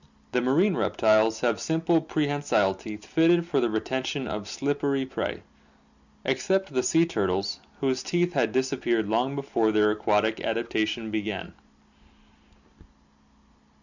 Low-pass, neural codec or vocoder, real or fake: 7.2 kHz; none; real